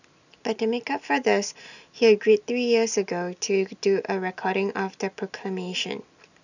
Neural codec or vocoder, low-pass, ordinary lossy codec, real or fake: none; 7.2 kHz; none; real